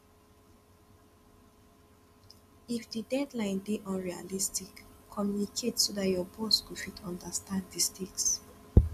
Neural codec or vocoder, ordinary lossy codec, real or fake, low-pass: none; none; real; 14.4 kHz